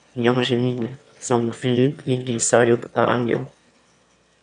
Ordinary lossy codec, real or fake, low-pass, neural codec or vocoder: MP3, 96 kbps; fake; 9.9 kHz; autoencoder, 22.05 kHz, a latent of 192 numbers a frame, VITS, trained on one speaker